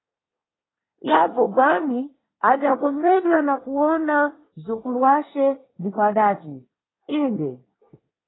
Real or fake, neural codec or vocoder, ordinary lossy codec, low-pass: fake; codec, 24 kHz, 1 kbps, SNAC; AAC, 16 kbps; 7.2 kHz